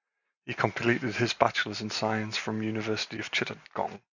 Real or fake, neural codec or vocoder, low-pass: real; none; 7.2 kHz